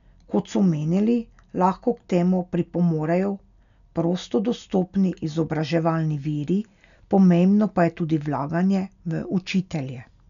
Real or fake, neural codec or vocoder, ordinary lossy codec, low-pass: real; none; none; 7.2 kHz